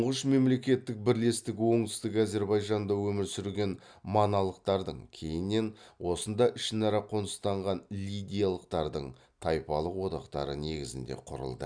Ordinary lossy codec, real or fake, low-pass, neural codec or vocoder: none; real; 9.9 kHz; none